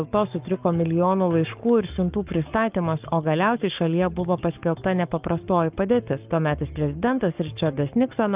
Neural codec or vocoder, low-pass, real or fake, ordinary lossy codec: codec, 44.1 kHz, 7.8 kbps, Pupu-Codec; 3.6 kHz; fake; Opus, 24 kbps